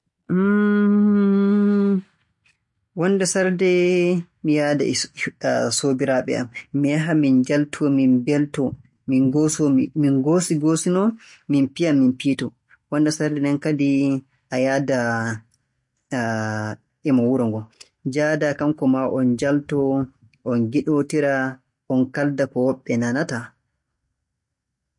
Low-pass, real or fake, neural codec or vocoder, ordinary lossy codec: 10.8 kHz; real; none; MP3, 48 kbps